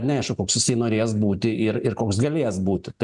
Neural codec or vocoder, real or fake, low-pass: none; real; 10.8 kHz